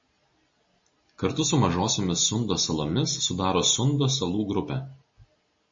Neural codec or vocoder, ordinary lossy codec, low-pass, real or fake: none; MP3, 32 kbps; 7.2 kHz; real